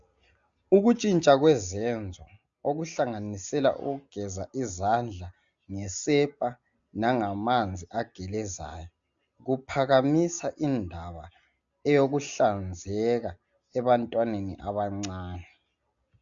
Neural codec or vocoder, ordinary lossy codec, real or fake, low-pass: none; AAC, 64 kbps; real; 7.2 kHz